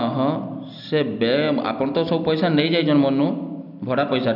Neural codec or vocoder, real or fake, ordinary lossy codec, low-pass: none; real; none; 5.4 kHz